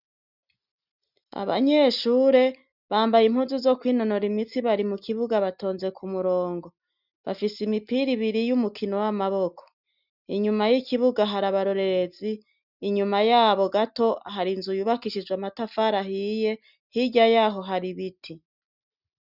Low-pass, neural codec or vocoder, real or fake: 5.4 kHz; none; real